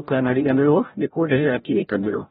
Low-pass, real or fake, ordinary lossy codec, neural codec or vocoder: 7.2 kHz; fake; AAC, 16 kbps; codec, 16 kHz, 0.5 kbps, FreqCodec, larger model